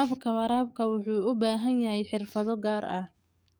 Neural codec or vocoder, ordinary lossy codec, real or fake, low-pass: codec, 44.1 kHz, 7.8 kbps, DAC; none; fake; none